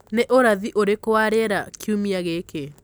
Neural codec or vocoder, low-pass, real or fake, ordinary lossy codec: none; none; real; none